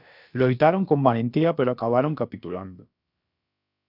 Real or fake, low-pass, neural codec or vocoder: fake; 5.4 kHz; codec, 16 kHz, about 1 kbps, DyCAST, with the encoder's durations